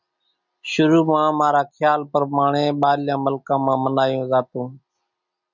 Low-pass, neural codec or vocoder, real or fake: 7.2 kHz; none; real